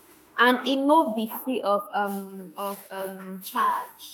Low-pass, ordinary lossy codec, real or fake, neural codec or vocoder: none; none; fake; autoencoder, 48 kHz, 32 numbers a frame, DAC-VAE, trained on Japanese speech